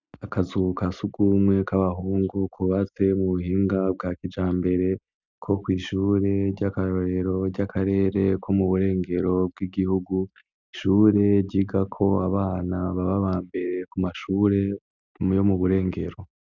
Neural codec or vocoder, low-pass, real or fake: none; 7.2 kHz; real